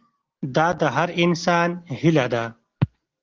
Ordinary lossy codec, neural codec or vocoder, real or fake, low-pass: Opus, 16 kbps; none; real; 7.2 kHz